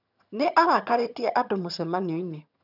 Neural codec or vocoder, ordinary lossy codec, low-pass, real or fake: vocoder, 22.05 kHz, 80 mel bands, HiFi-GAN; none; 5.4 kHz; fake